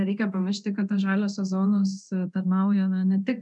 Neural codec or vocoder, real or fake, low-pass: codec, 24 kHz, 0.9 kbps, DualCodec; fake; 10.8 kHz